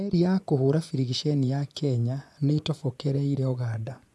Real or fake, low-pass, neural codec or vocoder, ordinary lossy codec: fake; none; vocoder, 24 kHz, 100 mel bands, Vocos; none